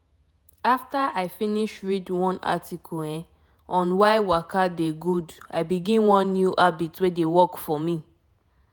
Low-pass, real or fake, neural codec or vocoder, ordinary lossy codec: none; fake; vocoder, 48 kHz, 128 mel bands, Vocos; none